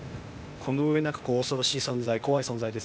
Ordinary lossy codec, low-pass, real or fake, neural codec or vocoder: none; none; fake; codec, 16 kHz, 0.8 kbps, ZipCodec